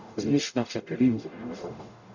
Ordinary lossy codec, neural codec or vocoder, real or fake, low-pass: none; codec, 44.1 kHz, 0.9 kbps, DAC; fake; 7.2 kHz